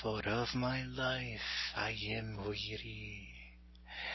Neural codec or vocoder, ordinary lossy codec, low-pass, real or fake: none; MP3, 24 kbps; 7.2 kHz; real